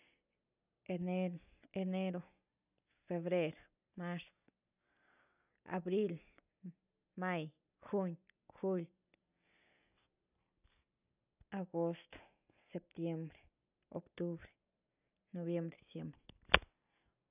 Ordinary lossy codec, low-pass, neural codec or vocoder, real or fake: none; 3.6 kHz; none; real